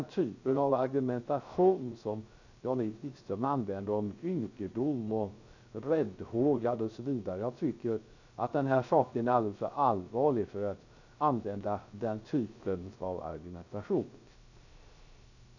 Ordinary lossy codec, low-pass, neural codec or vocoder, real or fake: none; 7.2 kHz; codec, 16 kHz, 0.3 kbps, FocalCodec; fake